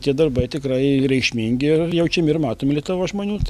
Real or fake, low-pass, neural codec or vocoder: real; 14.4 kHz; none